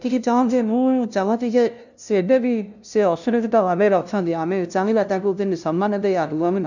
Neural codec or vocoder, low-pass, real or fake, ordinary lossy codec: codec, 16 kHz, 0.5 kbps, FunCodec, trained on LibriTTS, 25 frames a second; 7.2 kHz; fake; none